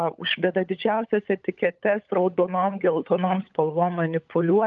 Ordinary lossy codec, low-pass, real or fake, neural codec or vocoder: Opus, 32 kbps; 7.2 kHz; fake; codec, 16 kHz, 16 kbps, FunCodec, trained on LibriTTS, 50 frames a second